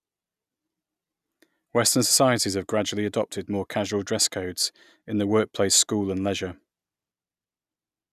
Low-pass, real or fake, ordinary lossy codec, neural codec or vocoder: 14.4 kHz; real; none; none